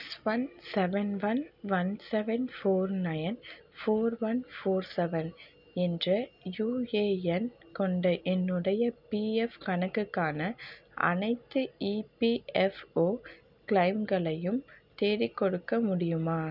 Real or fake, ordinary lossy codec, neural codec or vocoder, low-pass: real; none; none; 5.4 kHz